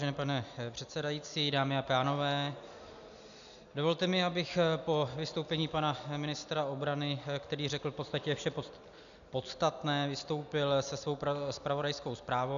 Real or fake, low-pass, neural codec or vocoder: real; 7.2 kHz; none